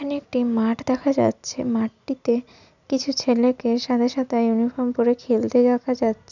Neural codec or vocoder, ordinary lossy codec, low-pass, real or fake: none; none; 7.2 kHz; real